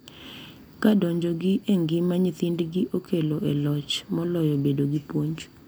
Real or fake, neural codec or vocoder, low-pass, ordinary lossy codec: real; none; none; none